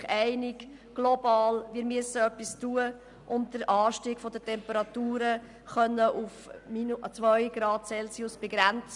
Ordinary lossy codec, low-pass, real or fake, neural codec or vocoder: none; 10.8 kHz; real; none